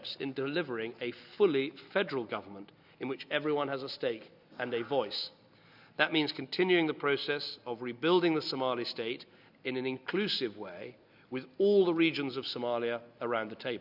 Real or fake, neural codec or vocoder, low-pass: real; none; 5.4 kHz